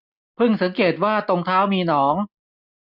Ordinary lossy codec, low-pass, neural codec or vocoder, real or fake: none; 5.4 kHz; none; real